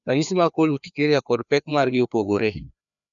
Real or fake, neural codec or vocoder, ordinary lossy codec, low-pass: fake; codec, 16 kHz, 2 kbps, FreqCodec, larger model; none; 7.2 kHz